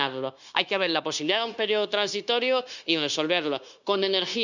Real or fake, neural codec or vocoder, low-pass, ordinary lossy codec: fake; codec, 16 kHz, 0.9 kbps, LongCat-Audio-Codec; 7.2 kHz; none